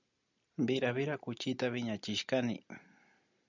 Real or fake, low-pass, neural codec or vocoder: real; 7.2 kHz; none